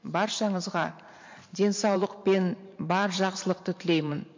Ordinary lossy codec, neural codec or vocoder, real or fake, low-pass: MP3, 48 kbps; vocoder, 44.1 kHz, 128 mel bands every 512 samples, BigVGAN v2; fake; 7.2 kHz